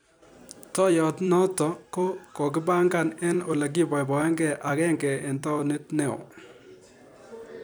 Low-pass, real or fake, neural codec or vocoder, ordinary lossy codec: none; fake; vocoder, 44.1 kHz, 128 mel bands every 256 samples, BigVGAN v2; none